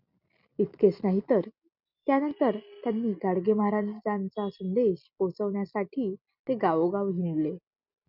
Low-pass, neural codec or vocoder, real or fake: 5.4 kHz; none; real